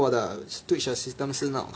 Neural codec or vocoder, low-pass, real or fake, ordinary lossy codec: none; none; real; none